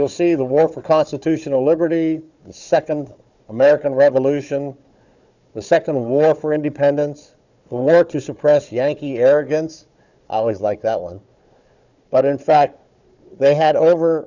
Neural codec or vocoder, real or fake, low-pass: codec, 16 kHz, 4 kbps, FunCodec, trained on Chinese and English, 50 frames a second; fake; 7.2 kHz